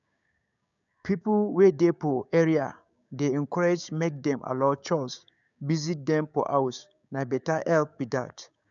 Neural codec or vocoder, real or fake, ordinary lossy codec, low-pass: codec, 16 kHz, 6 kbps, DAC; fake; none; 7.2 kHz